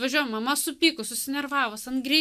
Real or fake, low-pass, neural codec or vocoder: real; 14.4 kHz; none